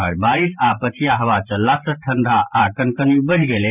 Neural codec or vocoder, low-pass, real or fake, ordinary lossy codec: vocoder, 44.1 kHz, 128 mel bands every 512 samples, BigVGAN v2; 3.6 kHz; fake; none